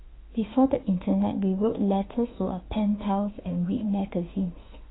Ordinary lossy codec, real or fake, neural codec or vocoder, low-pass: AAC, 16 kbps; fake; autoencoder, 48 kHz, 32 numbers a frame, DAC-VAE, trained on Japanese speech; 7.2 kHz